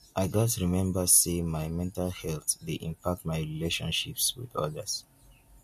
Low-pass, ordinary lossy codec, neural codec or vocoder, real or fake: 14.4 kHz; MP3, 64 kbps; none; real